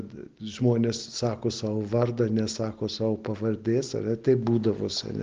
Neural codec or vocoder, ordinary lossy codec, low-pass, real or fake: none; Opus, 16 kbps; 7.2 kHz; real